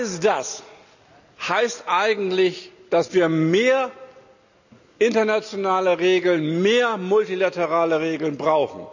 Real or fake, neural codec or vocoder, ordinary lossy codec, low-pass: real; none; none; 7.2 kHz